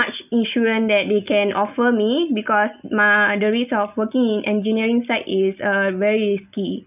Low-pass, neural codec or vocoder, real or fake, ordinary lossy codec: 3.6 kHz; none; real; none